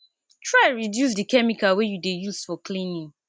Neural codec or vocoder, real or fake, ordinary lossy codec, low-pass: none; real; none; none